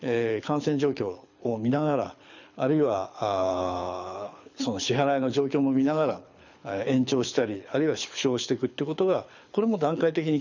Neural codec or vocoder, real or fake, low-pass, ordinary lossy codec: codec, 24 kHz, 6 kbps, HILCodec; fake; 7.2 kHz; none